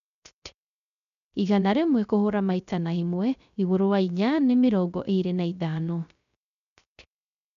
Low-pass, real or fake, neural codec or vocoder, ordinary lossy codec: 7.2 kHz; fake; codec, 16 kHz, 0.3 kbps, FocalCodec; none